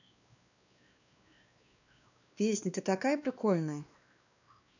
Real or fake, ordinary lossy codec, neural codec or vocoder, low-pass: fake; none; codec, 16 kHz, 2 kbps, X-Codec, WavLM features, trained on Multilingual LibriSpeech; 7.2 kHz